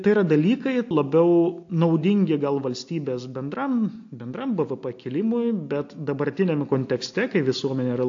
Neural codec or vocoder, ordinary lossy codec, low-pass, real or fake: none; AAC, 48 kbps; 7.2 kHz; real